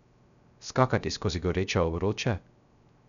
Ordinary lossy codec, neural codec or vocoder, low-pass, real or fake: none; codec, 16 kHz, 0.3 kbps, FocalCodec; 7.2 kHz; fake